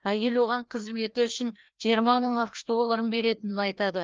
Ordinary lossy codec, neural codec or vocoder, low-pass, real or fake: Opus, 24 kbps; codec, 16 kHz, 1 kbps, FreqCodec, larger model; 7.2 kHz; fake